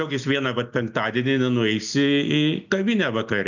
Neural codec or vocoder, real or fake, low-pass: none; real; 7.2 kHz